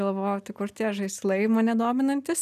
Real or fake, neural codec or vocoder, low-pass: real; none; 14.4 kHz